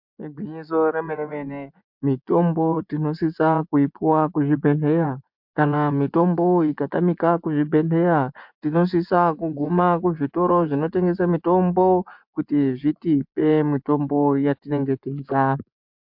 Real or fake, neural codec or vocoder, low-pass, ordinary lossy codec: fake; vocoder, 24 kHz, 100 mel bands, Vocos; 5.4 kHz; MP3, 48 kbps